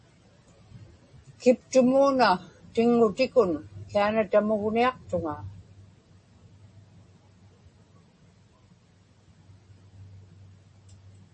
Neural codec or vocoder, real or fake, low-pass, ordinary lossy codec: none; real; 10.8 kHz; MP3, 32 kbps